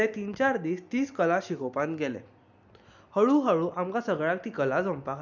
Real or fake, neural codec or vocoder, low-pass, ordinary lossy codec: real; none; 7.2 kHz; none